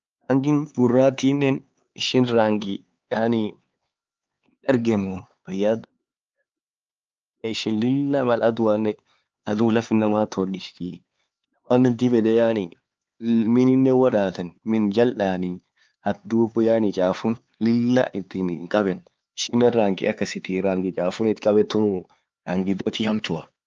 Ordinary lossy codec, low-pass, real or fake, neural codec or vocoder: Opus, 24 kbps; 7.2 kHz; fake; codec, 16 kHz, 4 kbps, X-Codec, HuBERT features, trained on LibriSpeech